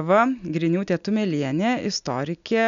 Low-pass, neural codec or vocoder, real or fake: 7.2 kHz; none; real